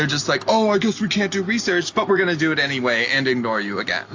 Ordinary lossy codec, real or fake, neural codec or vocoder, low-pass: AAC, 48 kbps; real; none; 7.2 kHz